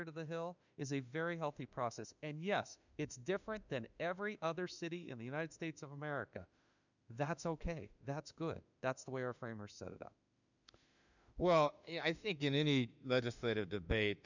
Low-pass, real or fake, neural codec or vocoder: 7.2 kHz; fake; autoencoder, 48 kHz, 32 numbers a frame, DAC-VAE, trained on Japanese speech